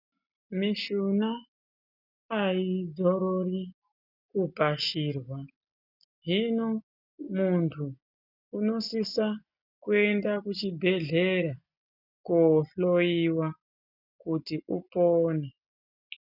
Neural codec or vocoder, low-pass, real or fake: none; 5.4 kHz; real